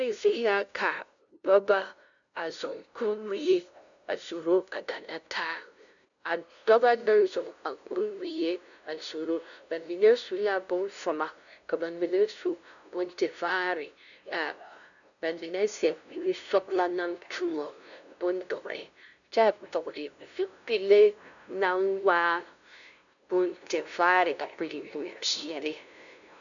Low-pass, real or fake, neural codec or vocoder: 7.2 kHz; fake; codec, 16 kHz, 0.5 kbps, FunCodec, trained on LibriTTS, 25 frames a second